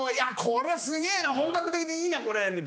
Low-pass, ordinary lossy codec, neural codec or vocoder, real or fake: none; none; codec, 16 kHz, 2 kbps, X-Codec, HuBERT features, trained on general audio; fake